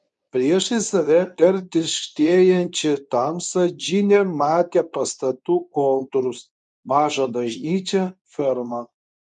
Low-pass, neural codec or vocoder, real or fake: 10.8 kHz; codec, 24 kHz, 0.9 kbps, WavTokenizer, medium speech release version 2; fake